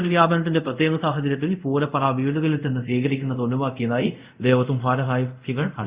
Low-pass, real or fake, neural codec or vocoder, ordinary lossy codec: 3.6 kHz; fake; codec, 24 kHz, 0.5 kbps, DualCodec; Opus, 64 kbps